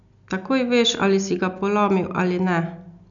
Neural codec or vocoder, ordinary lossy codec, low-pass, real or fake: none; none; 7.2 kHz; real